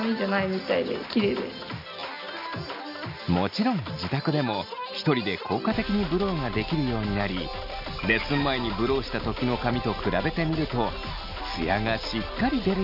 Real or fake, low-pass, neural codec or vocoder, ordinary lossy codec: real; 5.4 kHz; none; none